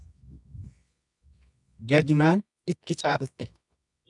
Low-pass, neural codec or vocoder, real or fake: 10.8 kHz; codec, 24 kHz, 0.9 kbps, WavTokenizer, medium music audio release; fake